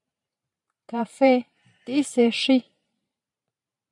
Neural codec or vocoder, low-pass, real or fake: vocoder, 44.1 kHz, 128 mel bands every 512 samples, BigVGAN v2; 10.8 kHz; fake